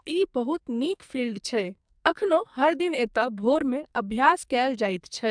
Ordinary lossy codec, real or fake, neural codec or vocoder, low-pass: MP3, 96 kbps; fake; codec, 44.1 kHz, 2.6 kbps, SNAC; 9.9 kHz